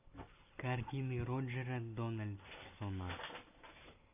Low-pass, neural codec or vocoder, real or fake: 3.6 kHz; none; real